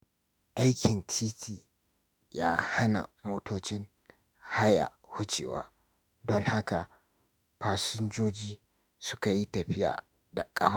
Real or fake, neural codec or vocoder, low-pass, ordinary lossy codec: fake; autoencoder, 48 kHz, 32 numbers a frame, DAC-VAE, trained on Japanese speech; none; none